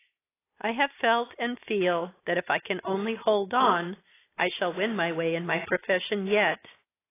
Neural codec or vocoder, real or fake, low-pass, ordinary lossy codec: none; real; 3.6 kHz; AAC, 16 kbps